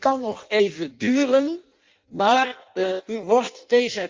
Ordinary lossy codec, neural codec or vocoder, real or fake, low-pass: Opus, 32 kbps; codec, 16 kHz in and 24 kHz out, 0.6 kbps, FireRedTTS-2 codec; fake; 7.2 kHz